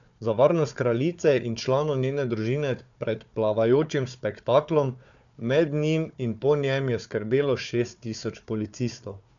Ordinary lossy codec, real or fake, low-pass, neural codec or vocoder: none; fake; 7.2 kHz; codec, 16 kHz, 4 kbps, FunCodec, trained on Chinese and English, 50 frames a second